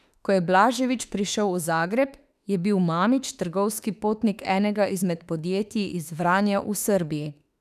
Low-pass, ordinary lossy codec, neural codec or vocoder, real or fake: 14.4 kHz; none; autoencoder, 48 kHz, 32 numbers a frame, DAC-VAE, trained on Japanese speech; fake